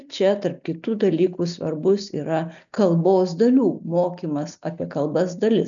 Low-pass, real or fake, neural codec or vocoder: 7.2 kHz; real; none